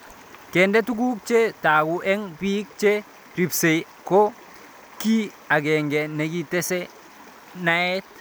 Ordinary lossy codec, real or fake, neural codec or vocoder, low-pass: none; real; none; none